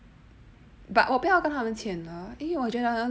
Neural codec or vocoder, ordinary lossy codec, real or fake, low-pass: none; none; real; none